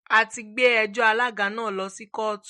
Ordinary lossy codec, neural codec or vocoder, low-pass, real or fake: MP3, 48 kbps; none; 19.8 kHz; real